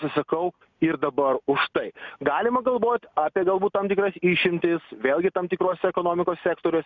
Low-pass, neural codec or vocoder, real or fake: 7.2 kHz; none; real